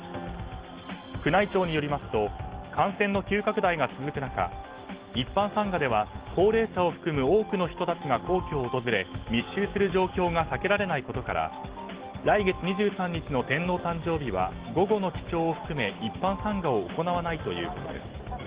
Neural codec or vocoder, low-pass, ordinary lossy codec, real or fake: none; 3.6 kHz; Opus, 16 kbps; real